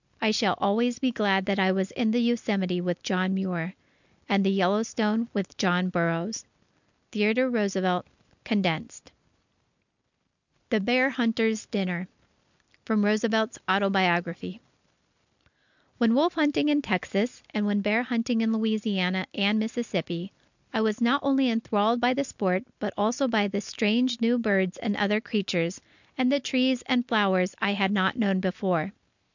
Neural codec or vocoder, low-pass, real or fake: none; 7.2 kHz; real